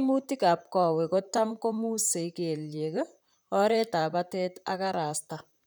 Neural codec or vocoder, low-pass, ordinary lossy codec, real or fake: vocoder, 44.1 kHz, 128 mel bands, Pupu-Vocoder; none; none; fake